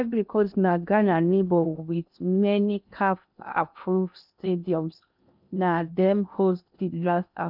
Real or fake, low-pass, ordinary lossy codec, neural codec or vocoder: fake; 5.4 kHz; none; codec, 16 kHz in and 24 kHz out, 0.8 kbps, FocalCodec, streaming, 65536 codes